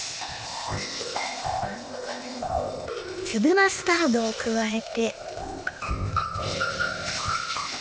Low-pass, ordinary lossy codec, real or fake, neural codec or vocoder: none; none; fake; codec, 16 kHz, 0.8 kbps, ZipCodec